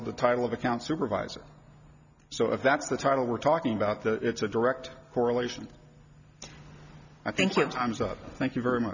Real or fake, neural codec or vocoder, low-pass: real; none; 7.2 kHz